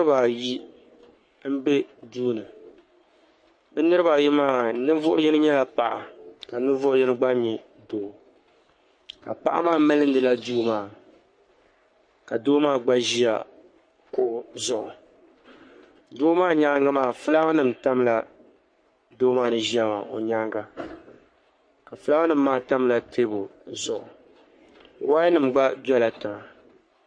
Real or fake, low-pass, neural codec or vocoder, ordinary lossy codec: fake; 9.9 kHz; codec, 44.1 kHz, 3.4 kbps, Pupu-Codec; MP3, 48 kbps